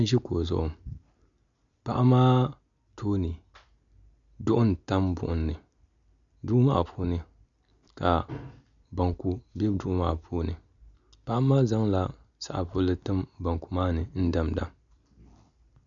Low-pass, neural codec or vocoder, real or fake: 7.2 kHz; none; real